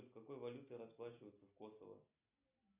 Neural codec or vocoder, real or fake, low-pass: none; real; 3.6 kHz